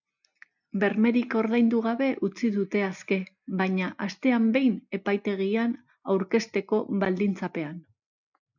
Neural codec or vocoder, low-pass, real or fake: none; 7.2 kHz; real